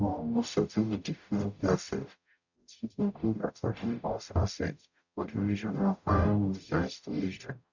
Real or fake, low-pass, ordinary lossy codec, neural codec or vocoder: fake; 7.2 kHz; none; codec, 44.1 kHz, 0.9 kbps, DAC